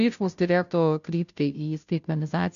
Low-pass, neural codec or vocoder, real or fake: 7.2 kHz; codec, 16 kHz, 0.5 kbps, FunCodec, trained on Chinese and English, 25 frames a second; fake